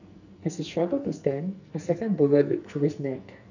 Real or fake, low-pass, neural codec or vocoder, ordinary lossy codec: fake; 7.2 kHz; codec, 44.1 kHz, 2.6 kbps, SNAC; none